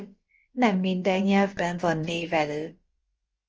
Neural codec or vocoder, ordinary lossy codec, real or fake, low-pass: codec, 16 kHz, about 1 kbps, DyCAST, with the encoder's durations; Opus, 24 kbps; fake; 7.2 kHz